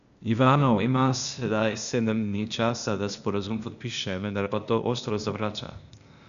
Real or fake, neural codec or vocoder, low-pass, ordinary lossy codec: fake; codec, 16 kHz, 0.8 kbps, ZipCodec; 7.2 kHz; none